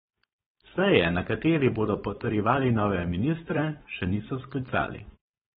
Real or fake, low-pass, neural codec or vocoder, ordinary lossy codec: fake; 7.2 kHz; codec, 16 kHz, 4.8 kbps, FACodec; AAC, 16 kbps